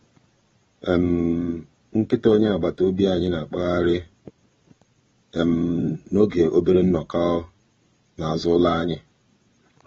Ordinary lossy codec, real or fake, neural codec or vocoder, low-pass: AAC, 24 kbps; fake; vocoder, 22.05 kHz, 80 mel bands, Vocos; 9.9 kHz